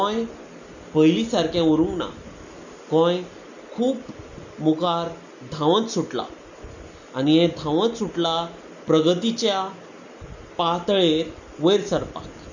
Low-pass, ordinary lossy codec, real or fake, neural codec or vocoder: 7.2 kHz; none; real; none